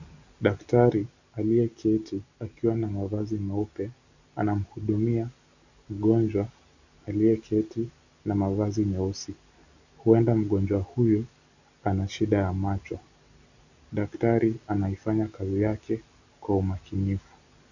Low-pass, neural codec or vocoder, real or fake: 7.2 kHz; none; real